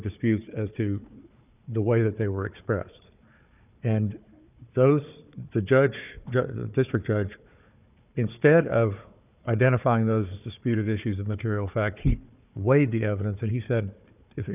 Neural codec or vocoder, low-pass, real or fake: codec, 16 kHz, 4 kbps, FunCodec, trained on Chinese and English, 50 frames a second; 3.6 kHz; fake